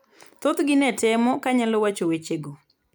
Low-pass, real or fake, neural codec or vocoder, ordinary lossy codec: none; real; none; none